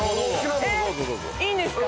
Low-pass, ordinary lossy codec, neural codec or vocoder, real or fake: none; none; none; real